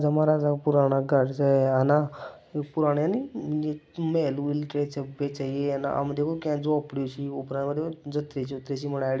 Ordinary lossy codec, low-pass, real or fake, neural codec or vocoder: none; none; real; none